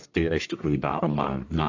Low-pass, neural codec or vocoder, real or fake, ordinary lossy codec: 7.2 kHz; codec, 44.1 kHz, 1.7 kbps, Pupu-Codec; fake; AAC, 48 kbps